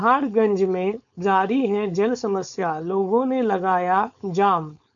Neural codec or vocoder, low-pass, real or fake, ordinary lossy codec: codec, 16 kHz, 4.8 kbps, FACodec; 7.2 kHz; fake; AAC, 64 kbps